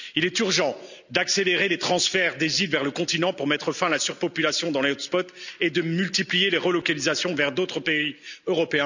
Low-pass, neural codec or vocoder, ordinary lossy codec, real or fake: 7.2 kHz; none; none; real